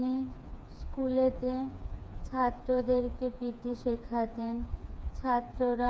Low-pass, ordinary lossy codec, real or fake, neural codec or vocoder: none; none; fake; codec, 16 kHz, 4 kbps, FreqCodec, smaller model